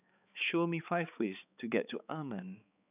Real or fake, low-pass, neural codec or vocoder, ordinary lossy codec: fake; 3.6 kHz; codec, 16 kHz, 4 kbps, X-Codec, HuBERT features, trained on balanced general audio; none